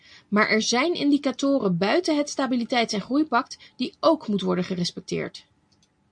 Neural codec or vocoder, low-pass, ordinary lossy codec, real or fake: none; 9.9 kHz; MP3, 64 kbps; real